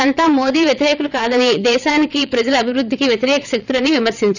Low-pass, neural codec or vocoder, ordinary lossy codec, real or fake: 7.2 kHz; vocoder, 22.05 kHz, 80 mel bands, WaveNeXt; none; fake